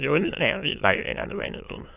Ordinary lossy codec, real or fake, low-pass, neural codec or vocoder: AAC, 32 kbps; fake; 3.6 kHz; autoencoder, 22.05 kHz, a latent of 192 numbers a frame, VITS, trained on many speakers